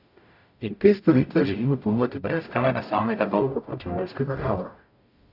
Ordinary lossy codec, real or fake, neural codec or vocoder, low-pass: none; fake; codec, 44.1 kHz, 0.9 kbps, DAC; 5.4 kHz